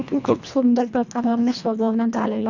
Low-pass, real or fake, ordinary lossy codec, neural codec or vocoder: 7.2 kHz; fake; none; codec, 24 kHz, 1.5 kbps, HILCodec